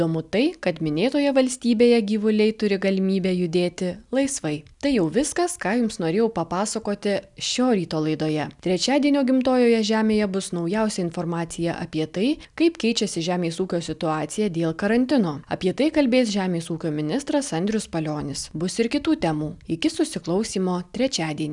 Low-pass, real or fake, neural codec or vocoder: 10.8 kHz; real; none